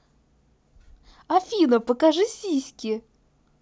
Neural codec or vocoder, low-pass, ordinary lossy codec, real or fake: none; none; none; real